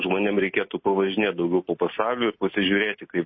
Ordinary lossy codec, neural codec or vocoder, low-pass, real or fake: MP3, 24 kbps; none; 7.2 kHz; real